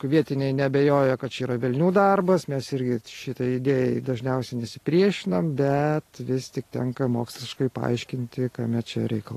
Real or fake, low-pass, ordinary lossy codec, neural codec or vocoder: real; 14.4 kHz; AAC, 48 kbps; none